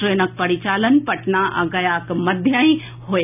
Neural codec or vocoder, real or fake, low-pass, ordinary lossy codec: none; real; 3.6 kHz; none